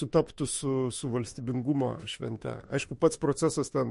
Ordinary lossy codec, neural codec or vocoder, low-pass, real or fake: MP3, 48 kbps; autoencoder, 48 kHz, 32 numbers a frame, DAC-VAE, trained on Japanese speech; 14.4 kHz; fake